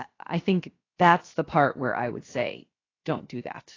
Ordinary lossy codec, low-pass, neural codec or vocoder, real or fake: AAC, 32 kbps; 7.2 kHz; codec, 16 kHz, 0.7 kbps, FocalCodec; fake